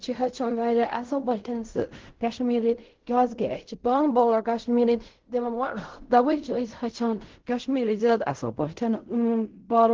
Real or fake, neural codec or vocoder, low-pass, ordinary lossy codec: fake; codec, 16 kHz in and 24 kHz out, 0.4 kbps, LongCat-Audio-Codec, fine tuned four codebook decoder; 7.2 kHz; Opus, 16 kbps